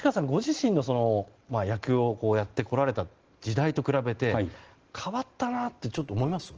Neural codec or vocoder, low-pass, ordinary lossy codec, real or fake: none; 7.2 kHz; Opus, 16 kbps; real